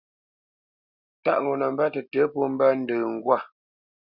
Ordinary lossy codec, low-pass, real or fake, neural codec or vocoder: Opus, 64 kbps; 5.4 kHz; real; none